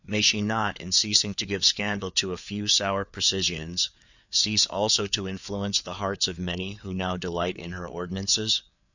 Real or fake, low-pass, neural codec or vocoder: fake; 7.2 kHz; codec, 16 kHz, 4 kbps, FreqCodec, larger model